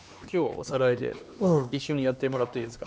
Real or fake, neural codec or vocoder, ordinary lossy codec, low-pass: fake; codec, 16 kHz, 2 kbps, X-Codec, HuBERT features, trained on LibriSpeech; none; none